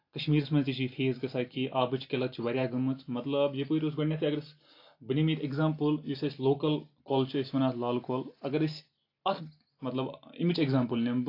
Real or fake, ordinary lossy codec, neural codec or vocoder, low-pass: real; AAC, 32 kbps; none; 5.4 kHz